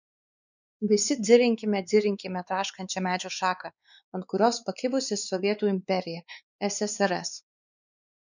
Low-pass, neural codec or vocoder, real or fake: 7.2 kHz; codec, 16 kHz, 4 kbps, X-Codec, WavLM features, trained on Multilingual LibriSpeech; fake